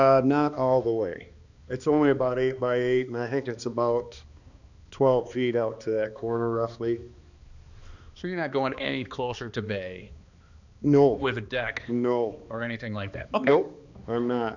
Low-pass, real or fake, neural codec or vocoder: 7.2 kHz; fake; codec, 16 kHz, 2 kbps, X-Codec, HuBERT features, trained on balanced general audio